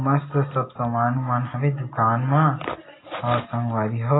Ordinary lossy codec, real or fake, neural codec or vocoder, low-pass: AAC, 16 kbps; real; none; 7.2 kHz